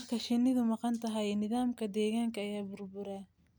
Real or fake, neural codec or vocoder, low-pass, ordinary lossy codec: real; none; none; none